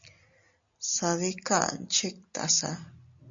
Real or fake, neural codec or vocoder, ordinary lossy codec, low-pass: real; none; MP3, 64 kbps; 7.2 kHz